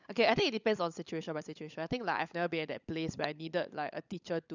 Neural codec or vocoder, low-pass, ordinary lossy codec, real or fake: codec, 16 kHz, 16 kbps, FunCodec, trained on LibriTTS, 50 frames a second; 7.2 kHz; none; fake